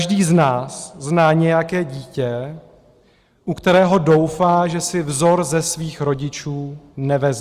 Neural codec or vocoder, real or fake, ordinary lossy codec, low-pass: none; real; Opus, 32 kbps; 14.4 kHz